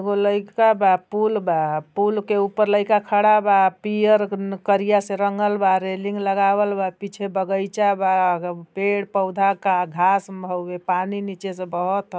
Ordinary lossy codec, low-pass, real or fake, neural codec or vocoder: none; none; real; none